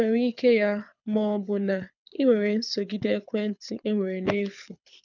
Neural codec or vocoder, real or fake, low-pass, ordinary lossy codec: codec, 24 kHz, 6 kbps, HILCodec; fake; 7.2 kHz; none